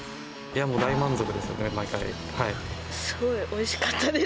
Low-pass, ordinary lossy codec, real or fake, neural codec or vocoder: none; none; real; none